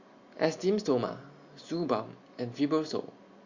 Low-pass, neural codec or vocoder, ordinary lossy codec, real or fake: 7.2 kHz; none; Opus, 64 kbps; real